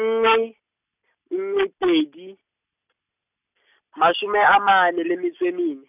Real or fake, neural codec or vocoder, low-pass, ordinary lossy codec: real; none; 3.6 kHz; none